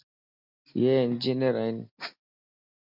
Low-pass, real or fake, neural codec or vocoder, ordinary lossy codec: 5.4 kHz; real; none; MP3, 48 kbps